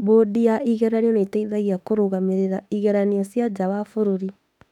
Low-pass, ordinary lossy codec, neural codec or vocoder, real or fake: 19.8 kHz; none; autoencoder, 48 kHz, 32 numbers a frame, DAC-VAE, trained on Japanese speech; fake